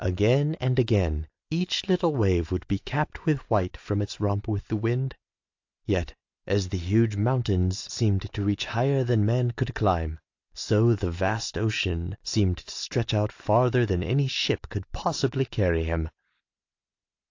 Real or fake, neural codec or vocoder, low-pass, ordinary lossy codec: real; none; 7.2 kHz; AAC, 48 kbps